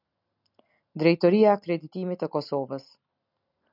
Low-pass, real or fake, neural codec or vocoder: 5.4 kHz; real; none